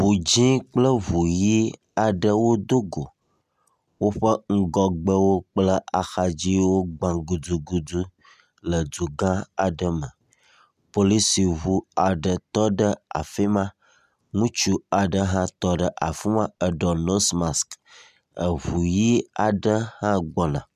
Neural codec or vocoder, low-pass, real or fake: none; 14.4 kHz; real